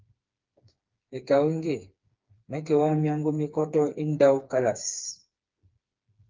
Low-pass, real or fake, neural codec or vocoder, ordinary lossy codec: 7.2 kHz; fake; codec, 16 kHz, 4 kbps, FreqCodec, smaller model; Opus, 32 kbps